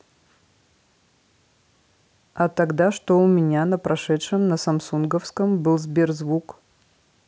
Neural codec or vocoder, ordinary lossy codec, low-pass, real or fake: none; none; none; real